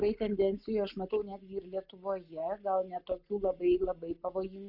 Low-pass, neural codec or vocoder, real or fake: 5.4 kHz; none; real